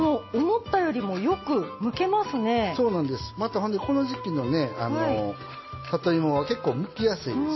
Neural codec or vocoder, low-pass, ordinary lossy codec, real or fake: none; 7.2 kHz; MP3, 24 kbps; real